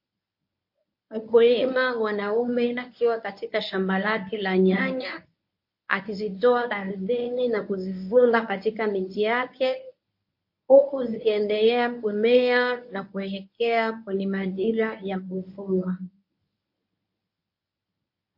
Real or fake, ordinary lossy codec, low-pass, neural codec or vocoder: fake; MP3, 32 kbps; 5.4 kHz; codec, 24 kHz, 0.9 kbps, WavTokenizer, medium speech release version 1